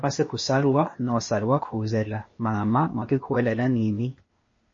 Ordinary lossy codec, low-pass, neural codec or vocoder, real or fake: MP3, 32 kbps; 7.2 kHz; codec, 16 kHz, 0.8 kbps, ZipCodec; fake